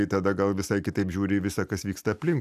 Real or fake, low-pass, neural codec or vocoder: real; 14.4 kHz; none